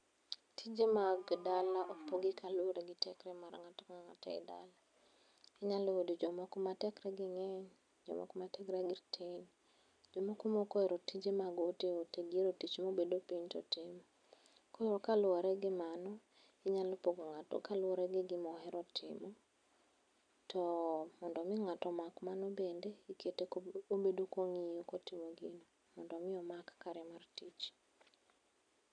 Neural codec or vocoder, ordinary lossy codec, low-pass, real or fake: none; none; 9.9 kHz; real